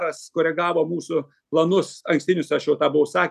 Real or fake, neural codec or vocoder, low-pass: real; none; 14.4 kHz